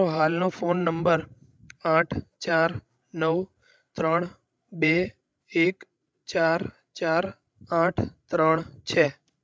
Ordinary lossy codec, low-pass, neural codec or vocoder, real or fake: none; none; codec, 16 kHz, 16 kbps, FreqCodec, larger model; fake